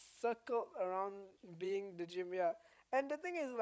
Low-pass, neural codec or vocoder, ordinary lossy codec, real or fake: none; codec, 16 kHz, 16 kbps, FreqCodec, larger model; none; fake